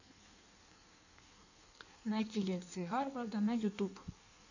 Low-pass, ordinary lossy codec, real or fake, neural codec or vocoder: 7.2 kHz; none; fake; codec, 16 kHz in and 24 kHz out, 1.1 kbps, FireRedTTS-2 codec